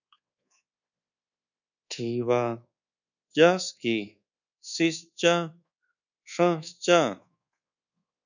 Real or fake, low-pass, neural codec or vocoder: fake; 7.2 kHz; codec, 24 kHz, 1.2 kbps, DualCodec